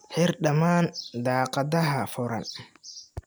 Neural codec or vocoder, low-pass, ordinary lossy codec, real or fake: none; none; none; real